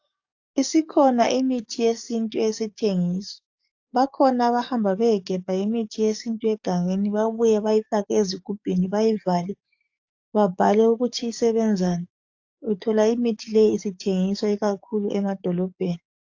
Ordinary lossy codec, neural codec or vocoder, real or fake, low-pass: AAC, 48 kbps; codec, 44.1 kHz, 7.8 kbps, DAC; fake; 7.2 kHz